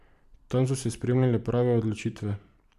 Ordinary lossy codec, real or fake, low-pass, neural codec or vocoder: none; fake; 14.4 kHz; vocoder, 44.1 kHz, 128 mel bands every 512 samples, BigVGAN v2